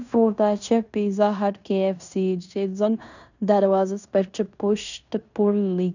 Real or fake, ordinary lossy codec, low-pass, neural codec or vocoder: fake; none; 7.2 kHz; codec, 16 kHz in and 24 kHz out, 0.9 kbps, LongCat-Audio-Codec, fine tuned four codebook decoder